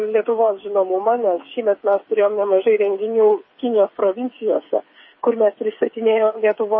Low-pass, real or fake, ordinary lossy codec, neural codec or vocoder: 7.2 kHz; fake; MP3, 24 kbps; codec, 16 kHz, 8 kbps, FreqCodec, smaller model